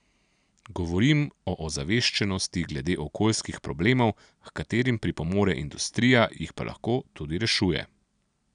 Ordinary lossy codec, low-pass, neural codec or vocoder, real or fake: none; 9.9 kHz; none; real